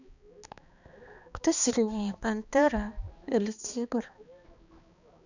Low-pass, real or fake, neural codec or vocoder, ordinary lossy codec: 7.2 kHz; fake; codec, 16 kHz, 2 kbps, X-Codec, HuBERT features, trained on balanced general audio; none